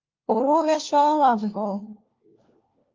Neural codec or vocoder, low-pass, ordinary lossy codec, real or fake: codec, 16 kHz, 1 kbps, FunCodec, trained on LibriTTS, 50 frames a second; 7.2 kHz; Opus, 24 kbps; fake